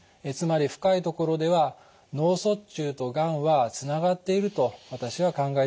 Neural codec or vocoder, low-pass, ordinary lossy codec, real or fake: none; none; none; real